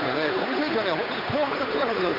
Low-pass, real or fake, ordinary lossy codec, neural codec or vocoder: 5.4 kHz; fake; none; codec, 16 kHz, 8 kbps, FunCodec, trained on Chinese and English, 25 frames a second